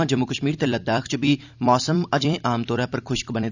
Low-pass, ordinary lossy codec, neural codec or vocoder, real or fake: 7.2 kHz; none; vocoder, 44.1 kHz, 128 mel bands every 256 samples, BigVGAN v2; fake